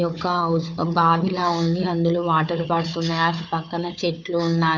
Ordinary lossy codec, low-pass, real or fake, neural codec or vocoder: none; 7.2 kHz; fake; codec, 16 kHz, 4 kbps, FunCodec, trained on Chinese and English, 50 frames a second